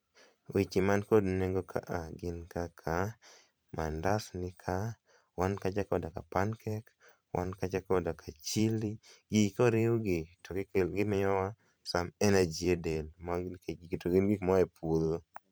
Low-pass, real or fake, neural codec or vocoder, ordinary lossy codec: none; fake; vocoder, 44.1 kHz, 128 mel bands every 512 samples, BigVGAN v2; none